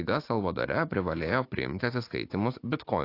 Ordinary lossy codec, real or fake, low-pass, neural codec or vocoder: AAC, 32 kbps; fake; 5.4 kHz; vocoder, 44.1 kHz, 80 mel bands, Vocos